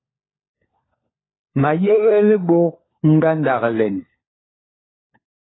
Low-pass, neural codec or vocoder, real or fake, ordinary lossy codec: 7.2 kHz; codec, 16 kHz, 4 kbps, FunCodec, trained on LibriTTS, 50 frames a second; fake; AAC, 16 kbps